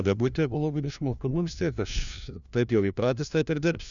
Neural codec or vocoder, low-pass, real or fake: codec, 16 kHz, 1 kbps, FunCodec, trained on LibriTTS, 50 frames a second; 7.2 kHz; fake